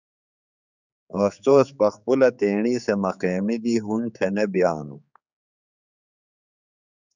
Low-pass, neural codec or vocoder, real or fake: 7.2 kHz; codec, 16 kHz, 4 kbps, X-Codec, HuBERT features, trained on general audio; fake